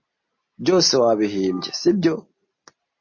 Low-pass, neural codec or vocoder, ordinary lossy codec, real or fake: 7.2 kHz; none; MP3, 32 kbps; real